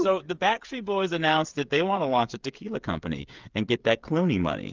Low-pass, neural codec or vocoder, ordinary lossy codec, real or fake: 7.2 kHz; codec, 16 kHz, 8 kbps, FreqCodec, smaller model; Opus, 24 kbps; fake